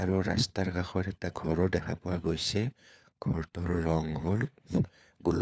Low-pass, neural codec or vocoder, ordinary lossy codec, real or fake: none; codec, 16 kHz, 4 kbps, FunCodec, trained on LibriTTS, 50 frames a second; none; fake